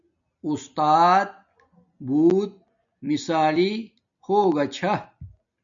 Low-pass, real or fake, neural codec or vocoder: 7.2 kHz; real; none